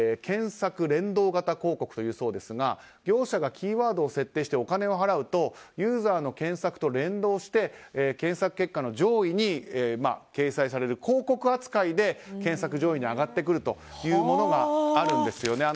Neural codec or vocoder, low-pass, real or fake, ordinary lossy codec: none; none; real; none